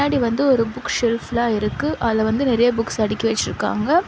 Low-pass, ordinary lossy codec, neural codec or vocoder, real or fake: none; none; none; real